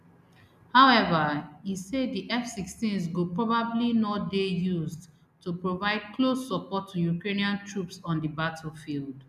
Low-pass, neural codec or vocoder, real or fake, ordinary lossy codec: 14.4 kHz; none; real; none